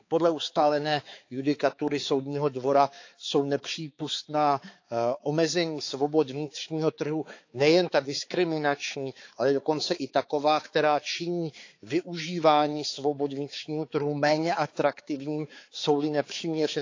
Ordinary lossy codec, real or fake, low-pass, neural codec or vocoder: AAC, 48 kbps; fake; 7.2 kHz; codec, 16 kHz, 4 kbps, X-Codec, HuBERT features, trained on balanced general audio